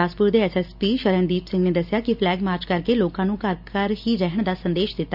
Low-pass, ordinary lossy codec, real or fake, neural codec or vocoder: 5.4 kHz; none; real; none